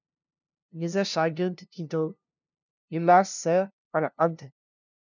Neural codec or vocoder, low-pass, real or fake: codec, 16 kHz, 0.5 kbps, FunCodec, trained on LibriTTS, 25 frames a second; 7.2 kHz; fake